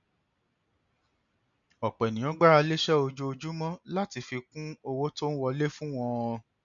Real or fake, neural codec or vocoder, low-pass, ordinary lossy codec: real; none; 7.2 kHz; none